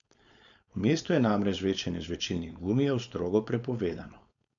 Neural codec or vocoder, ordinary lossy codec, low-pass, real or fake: codec, 16 kHz, 4.8 kbps, FACodec; none; 7.2 kHz; fake